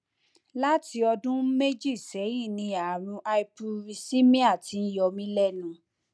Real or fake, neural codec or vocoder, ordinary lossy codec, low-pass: real; none; none; none